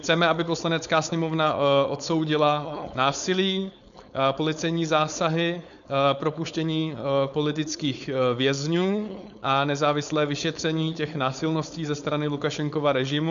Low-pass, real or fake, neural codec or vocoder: 7.2 kHz; fake; codec, 16 kHz, 4.8 kbps, FACodec